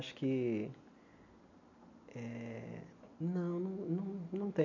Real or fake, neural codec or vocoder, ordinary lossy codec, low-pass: real; none; none; 7.2 kHz